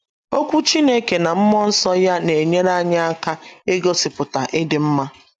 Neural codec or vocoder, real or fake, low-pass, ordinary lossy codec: none; real; 10.8 kHz; none